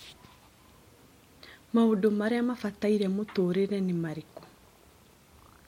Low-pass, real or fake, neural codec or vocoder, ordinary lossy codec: 19.8 kHz; real; none; MP3, 64 kbps